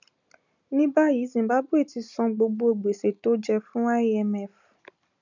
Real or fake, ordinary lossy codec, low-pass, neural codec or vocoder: real; none; 7.2 kHz; none